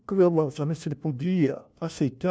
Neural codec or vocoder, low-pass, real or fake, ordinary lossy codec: codec, 16 kHz, 1 kbps, FunCodec, trained on LibriTTS, 50 frames a second; none; fake; none